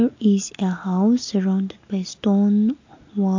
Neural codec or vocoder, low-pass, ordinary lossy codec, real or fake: none; 7.2 kHz; AAC, 48 kbps; real